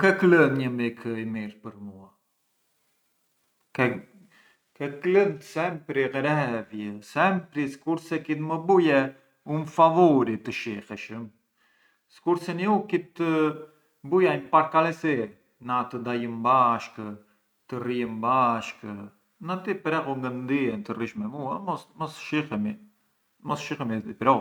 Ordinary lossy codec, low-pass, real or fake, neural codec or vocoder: none; 19.8 kHz; real; none